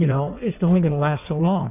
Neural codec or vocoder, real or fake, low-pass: codec, 16 kHz in and 24 kHz out, 1.1 kbps, FireRedTTS-2 codec; fake; 3.6 kHz